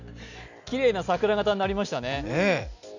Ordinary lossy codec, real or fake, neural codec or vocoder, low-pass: none; real; none; 7.2 kHz